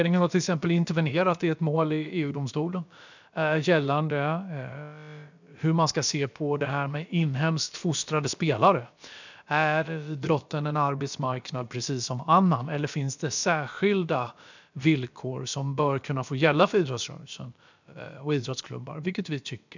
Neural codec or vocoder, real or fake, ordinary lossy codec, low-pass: codec, 16 kHz, about 1 kbps, DyCAST, with the encoder's durations; fake; none; 7.2 kHz